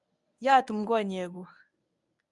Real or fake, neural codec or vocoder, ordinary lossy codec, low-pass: fake; codec, 24 kHz, 0.9 kbps, WavTokenizer, medium speech release version 1; none; 10.8 kHz